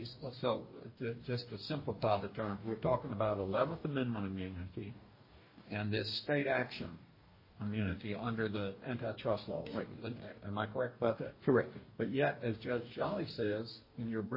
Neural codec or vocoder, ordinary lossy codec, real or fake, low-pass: codec, 44.1 kHz, 2.6 kbps, DAC; MP3, 24 kbps; fake; 5.4 kHz